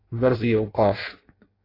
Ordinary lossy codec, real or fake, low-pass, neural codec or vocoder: AAC, 24 kbps; fake; 5.4 kHz; codec, 16 kHz in and 24 kHz out, 0.6 kbps, FireRedTTS-2 codec